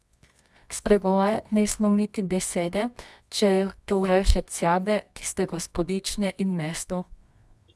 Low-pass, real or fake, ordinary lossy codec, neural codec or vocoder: none; fake; none; codec, 24 kHz, 0.9 kbps, WavTokenizer, medium music audio release